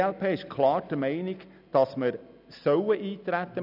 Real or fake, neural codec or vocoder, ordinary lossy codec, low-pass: real; none; none; 5.4 kHz